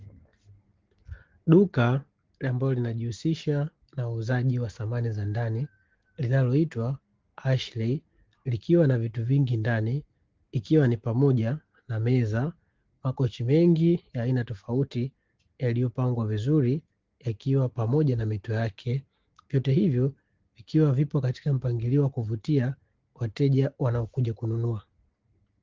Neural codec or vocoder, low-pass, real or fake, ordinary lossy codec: none; 7.2 kHz; real; Opus, 16 kbps